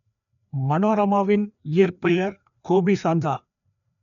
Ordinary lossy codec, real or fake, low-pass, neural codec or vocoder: none; fake; 7.2 kHz; codec, 16 kHz, 2 kbps, FreqCodec, larger model